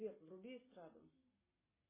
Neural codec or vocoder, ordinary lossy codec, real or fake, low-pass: none; MP3, 16 kbps; real; 3.6 kHz